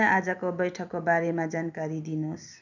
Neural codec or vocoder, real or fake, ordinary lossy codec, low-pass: none; real; none; 7.2 kHz